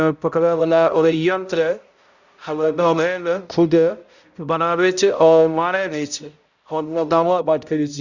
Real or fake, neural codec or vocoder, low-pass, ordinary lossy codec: fake; codec, 16 kHz, 0.5 kbps, X-Codec, HuBERT features, trained on balanced general audio; 7.2 kHz; Opus, 64 kbps